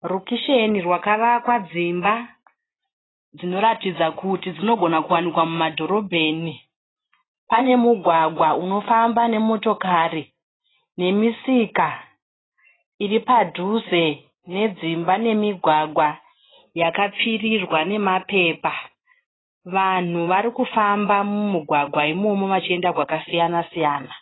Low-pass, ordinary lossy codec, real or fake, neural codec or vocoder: 7.2 kHz; AAC, 16 kbps; real; none